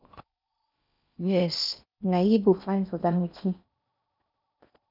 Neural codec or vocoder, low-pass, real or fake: codec, 16 kHz in and 24 kHz out, 0.6 kbps, FocalCodec, streaming, 4096 codes; 5.4 kHz; fake